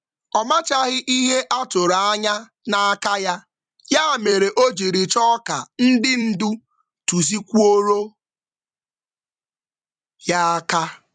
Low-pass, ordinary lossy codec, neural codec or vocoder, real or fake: 9.9 kHz; MP3, 96 kbps; vocoder, 44.1 kHz, 128 mel bands every 256 samples, BigVGAN v2; fake